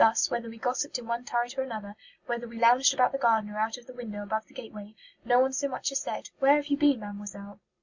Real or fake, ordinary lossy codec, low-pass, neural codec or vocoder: real; Opus, 64 kbps; 7.2 kHz; none